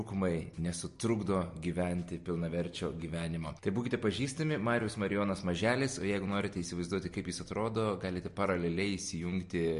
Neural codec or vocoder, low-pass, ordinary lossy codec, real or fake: none; 10.8 kHz; MP3, 48 kbps; real